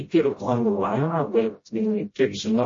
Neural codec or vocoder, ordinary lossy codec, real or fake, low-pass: codec, 16 kHz, 0.5 kbps, FreqCodec, smaller model; MP3, 32 kbps; fake; 7.2 kHz